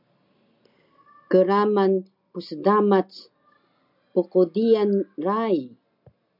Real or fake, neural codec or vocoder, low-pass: real; none; 5.4 kHz